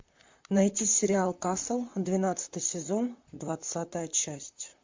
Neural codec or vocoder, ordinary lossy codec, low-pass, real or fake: vocoder, 44.1 kHz, 128 mel bands, Pupu-Vocoder; MP3, 48 kbps; 7.2 kHz; fake